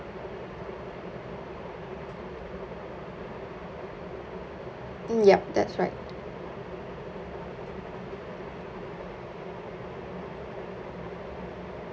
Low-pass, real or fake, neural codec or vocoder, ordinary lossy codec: none; real; none; none